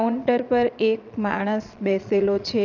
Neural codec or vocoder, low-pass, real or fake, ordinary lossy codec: vocoder, 22.05 kHz, 80 mel bands, Vocos; 7.2 kHz; fake; none